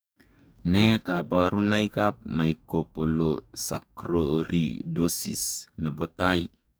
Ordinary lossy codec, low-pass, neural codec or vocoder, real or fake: none; none; codec, 44.1 kHz, 2.6 kbps, DAC; fake